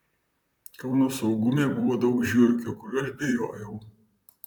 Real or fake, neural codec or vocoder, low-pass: fake; vocoder, 44.1 kHz, 128 mel bands, Pupu-Vocoder; 19.8 kHz